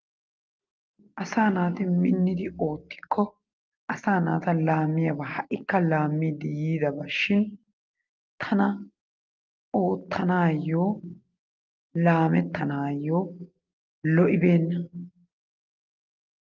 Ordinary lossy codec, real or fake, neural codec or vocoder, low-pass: Opus, 32 kbps; real; none; 7.2 kHz